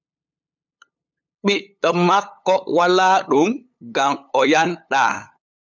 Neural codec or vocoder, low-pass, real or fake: codec, 16 kHz, 8 kbps, FunCodec, trained on LibriTTS, 25 frames a second; 7.2 kHz; fake